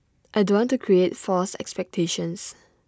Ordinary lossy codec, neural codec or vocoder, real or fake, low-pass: none; none; real; none